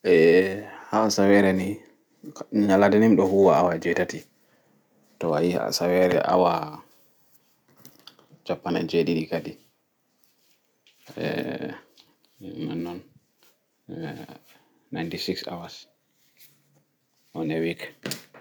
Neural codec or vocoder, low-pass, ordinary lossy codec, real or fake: none; none; none; real